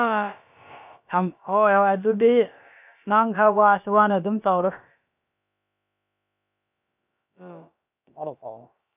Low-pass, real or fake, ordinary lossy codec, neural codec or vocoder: 3.6 kHz; fake; none; codec, 16 kHz, about 1 kbps, DyCAST, with the encoder's durations